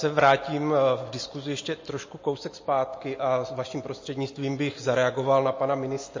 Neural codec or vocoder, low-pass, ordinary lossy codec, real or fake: none; 7.2 kHz; MP3, 32 kbps; real